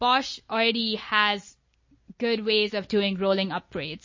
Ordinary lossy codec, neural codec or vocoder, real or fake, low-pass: MP3, 32 kbps; none; real; 7.2 kHz